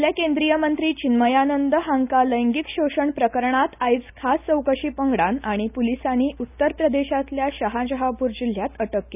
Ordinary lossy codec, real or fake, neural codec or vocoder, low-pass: none; real; none; 3.6 kHz